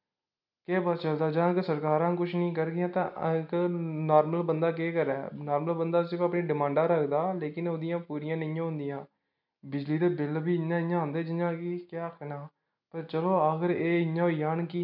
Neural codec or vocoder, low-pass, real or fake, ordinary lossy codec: none; 5.4 kHz; real; none